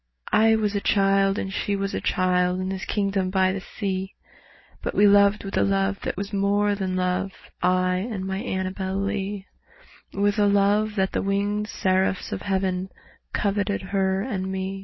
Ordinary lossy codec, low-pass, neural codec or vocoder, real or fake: MP3, 24 kbps; 7.2 kHz; none; real